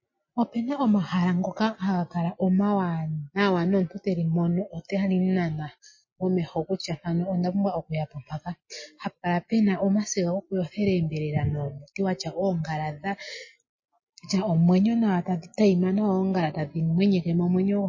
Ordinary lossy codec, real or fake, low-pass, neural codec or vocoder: MP3, 32 kbps; real; 7.2 kHz; none